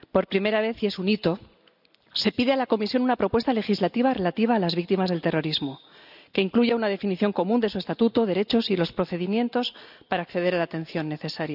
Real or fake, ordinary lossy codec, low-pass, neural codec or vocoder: real; none; 5.4 kHz; none